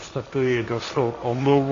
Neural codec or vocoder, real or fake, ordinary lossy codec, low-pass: codec, 16 kHz, 1.1 kbps, Voila-Tokenizer; fake; AAC, 48 kbps; 7.2 kHz